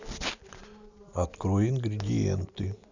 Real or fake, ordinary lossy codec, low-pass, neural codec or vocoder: real; none; 7.2 kHz; none